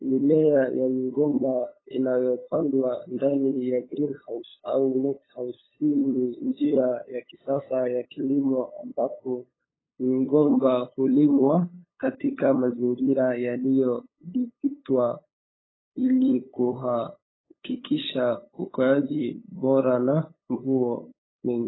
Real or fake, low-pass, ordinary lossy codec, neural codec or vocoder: fake; 7.2 kHz; AAC, 16 kbps; codec, 16 kHz, 8 kbps, FunCodec, trained on LibriTTS, 25 frames a second